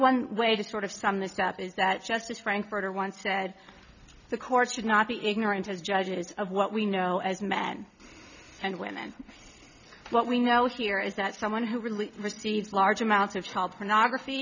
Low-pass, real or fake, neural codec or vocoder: 7.2 kHz; real; none